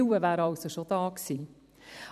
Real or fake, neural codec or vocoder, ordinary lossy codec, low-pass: fake; vocoder, 44.1 kHz, 128 mel bands every 256 samples, BigVGAN v2; none; 14.4 kHz